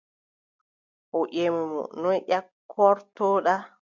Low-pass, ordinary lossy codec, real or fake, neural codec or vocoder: 7.2 kHz; MP3, 64 kbps; real; none